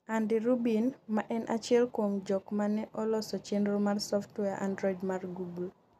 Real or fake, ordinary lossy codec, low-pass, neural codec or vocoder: real; none; 14.4 kHz; none